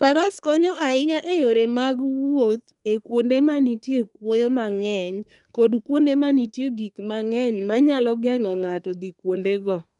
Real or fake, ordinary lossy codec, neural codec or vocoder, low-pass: fake; none; codec, 24 kHz, 1 kbps, SNAC; 10.8 kHz